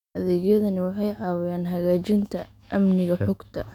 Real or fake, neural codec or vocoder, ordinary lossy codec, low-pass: fake; autoencoder, 48 kHz, 128 numbers a frame, DAC-VAE, trained on Japanese speech; none; 19.8 kHz